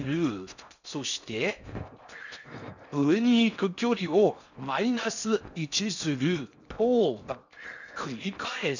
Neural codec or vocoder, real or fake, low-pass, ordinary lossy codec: codec, 16 kHz in and 24 kHz out, 0.6 kbps, FocalCodec, streaming, 4096 codes; fake; 7.2 kHz; none